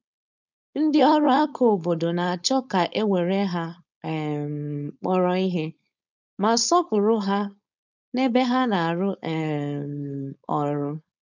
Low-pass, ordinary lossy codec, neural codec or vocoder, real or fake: 7.2 kHz; none; codec, 16 kHz, 4.8 kbps, FACodec; fake